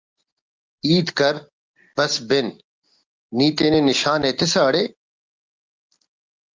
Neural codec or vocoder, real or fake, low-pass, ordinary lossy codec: none; real; 7.2 kHz; Opus, 24 kbps